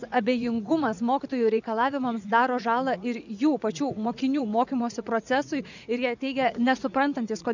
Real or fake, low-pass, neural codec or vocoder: fake; 7.2 kHz; vocoder, 44.1 kHz, 80 mel bands, Vocos